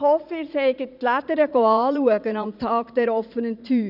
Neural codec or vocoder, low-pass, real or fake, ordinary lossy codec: vocoder, 44.1 kHz, 80 mel bands, Vocos; 5.4 kHz; fake; none